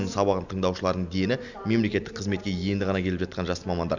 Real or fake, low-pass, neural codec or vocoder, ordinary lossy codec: real; 7.2 kHz; none; none